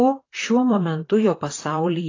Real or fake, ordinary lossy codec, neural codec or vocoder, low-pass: fake; AAC, 32 kbps; vocoder, 44.1 kHz, 128 mel bands, Pupu-Vocoder; 7.2 kHz